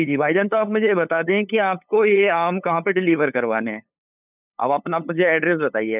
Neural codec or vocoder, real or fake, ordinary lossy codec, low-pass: codec, 16 kHz, 8 kbps, FunCodec, trained on LibriTTS, 25 frames a second; fake; none; 3.6 kHz